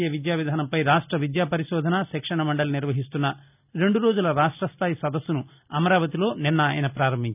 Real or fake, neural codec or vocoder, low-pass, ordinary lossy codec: real; none; 3.6 kHz; none